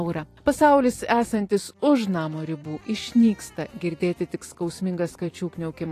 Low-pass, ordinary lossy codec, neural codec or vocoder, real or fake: 14.4 kHz; AAC, 48 kbps; vocoder, 44.1 kHz, 128 mel bands every 256 samples, BigVGAN v2; fake